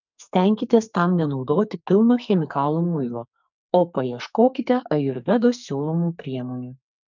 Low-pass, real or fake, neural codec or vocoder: 7.2 kHz; fake; codec, 44.1 kHz, 2.6 kbps, SNAC